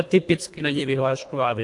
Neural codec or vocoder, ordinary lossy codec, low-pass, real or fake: codec, 24 kHz, 1.5 kbps, HILCodec; AAC, 64 kbps; 10.8 kHz; fake